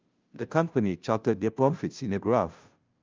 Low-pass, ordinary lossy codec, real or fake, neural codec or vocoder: 7.2 kHz; Opus, 24 kbps; fake; codec, 16 kHz, 0.5 kbps, FunCodec, trained on Chinese and English, 25 frames a second